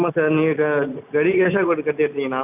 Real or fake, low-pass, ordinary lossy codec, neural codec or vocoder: real; 3.6 kHz; none; none